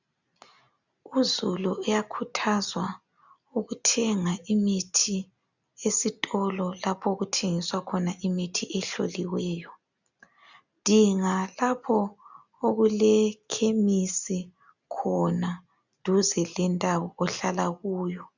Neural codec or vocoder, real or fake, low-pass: none; real; 7.2 kHz